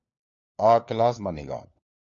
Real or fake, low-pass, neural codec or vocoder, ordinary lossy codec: fake; 7.2 kHz; codec, 16 kHz, 4 kbps, FunCodec, trained on LibriTTS, 50 frames a second; MP3, 64 kbps